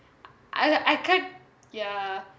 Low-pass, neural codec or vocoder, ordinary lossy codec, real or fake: none; none; none; real